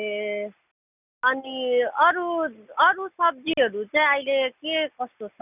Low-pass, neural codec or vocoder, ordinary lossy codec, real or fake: 3.6 kHz; none; none; real